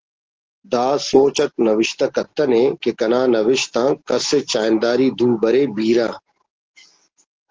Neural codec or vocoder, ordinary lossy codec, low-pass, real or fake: none; Opus, 16 kbps; 7.2 kHz; real